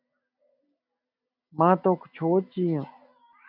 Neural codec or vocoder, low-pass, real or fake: none; 5.4 kHz; real